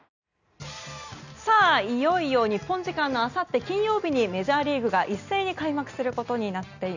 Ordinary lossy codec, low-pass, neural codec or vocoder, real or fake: none; 7.2 kHz; none; real